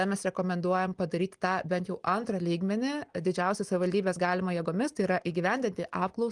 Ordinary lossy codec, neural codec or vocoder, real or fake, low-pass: Opus, 24 kbps; none; real; 10.8 kHz